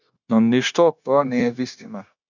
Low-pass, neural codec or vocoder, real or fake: 7.2 kHz; codec, 16 kHz, 0.9 kbps, LongCat-Audio-Codec; fake